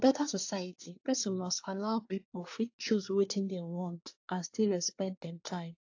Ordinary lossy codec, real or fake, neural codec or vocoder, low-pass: AAC, 48 kbps; fake; codec, 24 kHz, 1 kbps, SNAC; 7.2 kHz